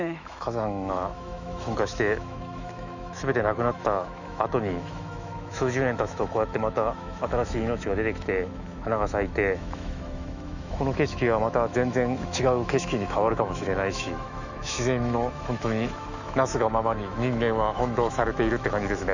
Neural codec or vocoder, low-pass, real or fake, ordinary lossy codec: autoencoder, 48 kHz, 128 numbers a frame, DAC-VAE, trained on Japanese speech; 7.2 kHz; fake; none